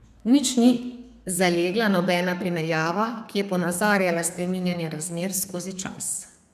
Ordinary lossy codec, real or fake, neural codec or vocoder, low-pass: none; fake; codec, 32 kHz, 1.9 kbps, SNAC; 14.4 kHz